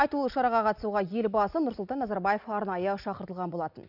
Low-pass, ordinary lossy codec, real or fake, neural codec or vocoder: 5.4 kHz; none; real; none